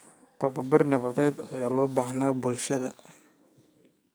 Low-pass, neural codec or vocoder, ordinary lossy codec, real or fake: none; codec, 44.1 kHz, 2.6 kbps, SNAC; none; fake